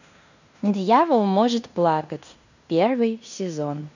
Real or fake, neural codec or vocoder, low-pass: fake; codec, 16 kHz in and 24 kHz out, 0.9 kbps, LongCat-Audio-Codec, fine tuned four codebook decoder; 7.2 kHz